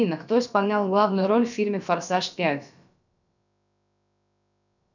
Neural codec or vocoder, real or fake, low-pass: codec, 16 kHz, about 1 kbps, DyCAST, with the encoder's durations; fake; 7.2 kHz